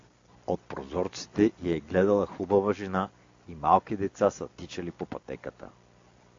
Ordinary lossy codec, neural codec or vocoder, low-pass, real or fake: AAC, 48 kbps; none; 7.2 kHz; real